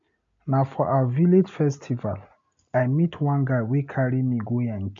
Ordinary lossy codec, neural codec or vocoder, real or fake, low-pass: AAC, 64 kbps; none; real; 7.2 kHz